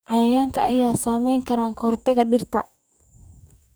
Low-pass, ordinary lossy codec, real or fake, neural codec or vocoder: none; none; fake; codec, 44.1 kHz, 2.6 kbps, DAC